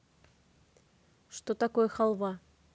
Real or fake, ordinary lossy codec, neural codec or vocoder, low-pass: real; none; none; none